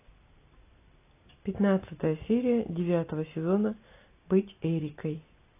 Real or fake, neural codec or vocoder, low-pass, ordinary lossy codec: real; none; 3.6 kHz; AAC, 24 kbps